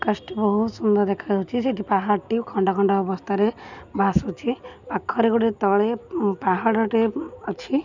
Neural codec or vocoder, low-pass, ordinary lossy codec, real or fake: none; 7.2 kHz; none; real